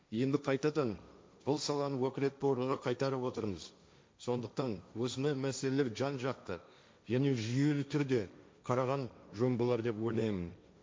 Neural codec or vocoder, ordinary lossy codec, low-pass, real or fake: codec, 16 kHz, 1.1 kbps, Voila-Tokenizer; none; none; fake